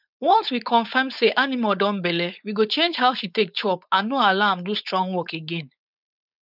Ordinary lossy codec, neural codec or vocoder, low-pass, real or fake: none; codec, 16 kHz, 4.8 kbps, FACodec; 5.4 kHz; fake